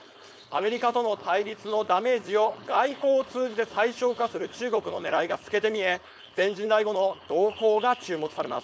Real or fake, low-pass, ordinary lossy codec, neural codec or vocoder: fake; none; none; codec, 16 kHz, 4.8 kbps, FACodec